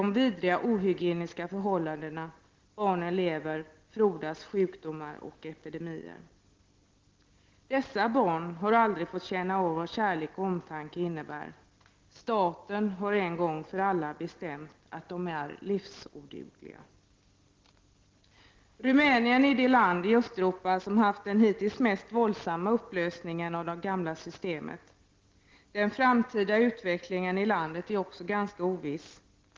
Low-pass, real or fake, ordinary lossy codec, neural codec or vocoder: 7.2 kHz; real; Opus, 16 kbps; none